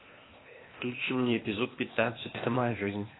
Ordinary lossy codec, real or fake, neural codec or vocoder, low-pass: AAC, 16 kbps; fake; codec, 16 kHz, 0.8 kbps, ZipCodec; 7.2 kHz